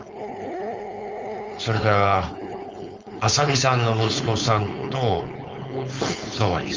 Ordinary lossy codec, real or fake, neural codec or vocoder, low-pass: Opus, 32 kbps; fake; codec, 16 kHz, 4.8 kbps, FACodec; 7.2 kHz